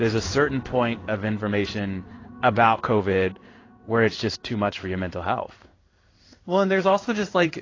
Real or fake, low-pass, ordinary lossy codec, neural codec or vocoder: fake; 7.2 kHz; AAC, 32 kbps; codec, 16 kHz in and 24 kHz out, 1 kbps, XY-Tokenizer